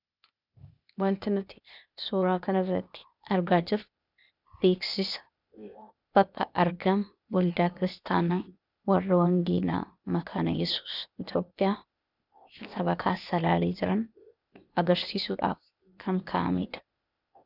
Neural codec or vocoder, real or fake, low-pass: codec, 16 kHz, 0.8 kbps, ZipCodec; fake; 5.4 kHz